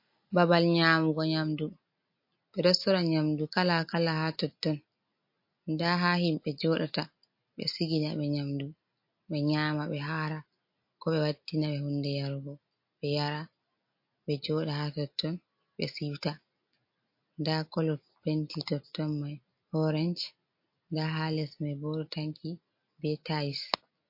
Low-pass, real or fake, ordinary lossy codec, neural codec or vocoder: 5.4 kHz; real; MP3, 32 kbps; none